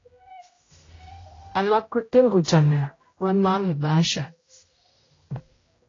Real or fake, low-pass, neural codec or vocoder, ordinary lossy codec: fake; 7.2 kHz; codec, 16 kHz, 0.5 kbps, X-Codec, HuBERT features, trained on general audio; AAC, 32 kbps